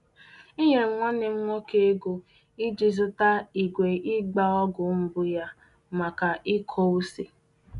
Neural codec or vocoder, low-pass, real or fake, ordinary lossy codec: none; 10.8 kHz; real; none